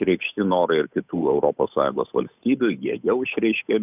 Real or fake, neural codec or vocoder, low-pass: fake; codec, 16 kHz, 8 kbps, FunCodec, trained on Chinese and English, 25 frames a second; 3.6 kHz